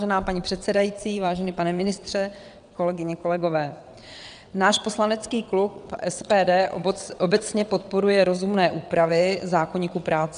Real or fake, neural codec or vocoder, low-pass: fake; vocoder, 22.05 kHz, 80 mel bands, WaveNeXt; 9.9 kHz